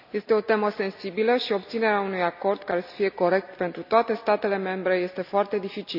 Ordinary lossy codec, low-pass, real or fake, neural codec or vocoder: none; 5.4 kHz; real; none